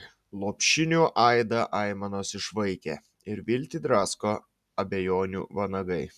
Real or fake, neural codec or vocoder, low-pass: real; none; 14.4 kHz